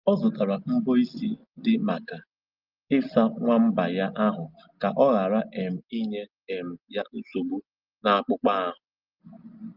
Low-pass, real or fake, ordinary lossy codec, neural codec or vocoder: 5.4 kHz; real; Opus, 32 kbps; none